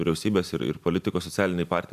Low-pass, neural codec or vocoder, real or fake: 14.4 kHz; none; real